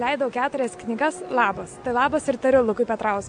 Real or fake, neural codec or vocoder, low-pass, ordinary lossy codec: real; none; 9.9 kHz; MP3, 64 kbps